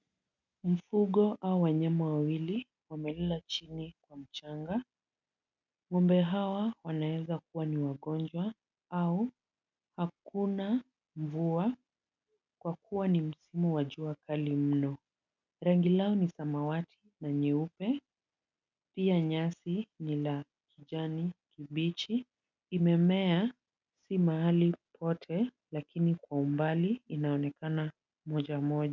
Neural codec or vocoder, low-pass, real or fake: none; 7.2 kHz; real